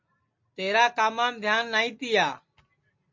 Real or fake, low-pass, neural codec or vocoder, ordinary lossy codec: real; 7.2 kHz; none; MP3, 48 kbps